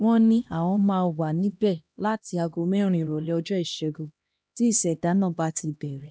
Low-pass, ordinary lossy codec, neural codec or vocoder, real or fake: none; none; codec, 16 kHz, 1 kbps, X-Codec, HuBERT features, trained on LibriSpeech; fake